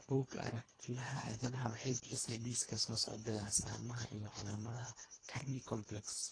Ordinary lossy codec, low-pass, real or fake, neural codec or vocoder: AAC, 32 kbps; 9.9 kHz; fake; codec, 24 kHz, 1.5 kbps, HILCodec